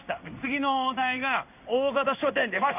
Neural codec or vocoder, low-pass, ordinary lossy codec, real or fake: codec, 16 kHz in and 24 kHz out, 1 kbps, XY-Tokenizer; 3.6 kHz; none; fake